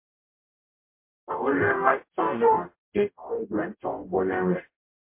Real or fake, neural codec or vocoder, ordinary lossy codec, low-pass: fake; codec, 44.1 kHz, 0.9 kbps, DAC; MP3, 24 kbps; 3.6 kHz